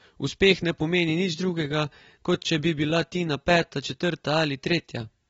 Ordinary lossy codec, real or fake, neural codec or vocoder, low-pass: AAC, 24 kbps; real; none; 19.8 kHz